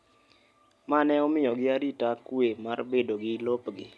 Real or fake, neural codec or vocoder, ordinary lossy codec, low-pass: real; none; none; none